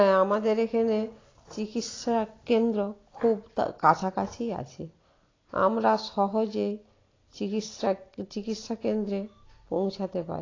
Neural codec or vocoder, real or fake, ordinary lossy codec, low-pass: none; real; AAC, 32 kbps; 7.2 kHz